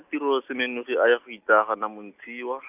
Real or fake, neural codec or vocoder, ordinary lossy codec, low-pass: real; none; none; 3.6 kHz